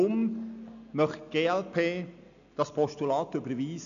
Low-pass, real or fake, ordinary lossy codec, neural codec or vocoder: 7.2 kHz; real; none; none